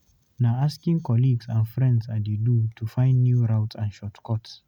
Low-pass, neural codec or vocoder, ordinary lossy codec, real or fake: 19.8 kHz; none; none; real